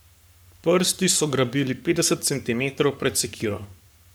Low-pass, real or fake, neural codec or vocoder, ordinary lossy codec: none; fake; codec, 44.1 kHz, 7.8 kbps, Pupu-Codec; none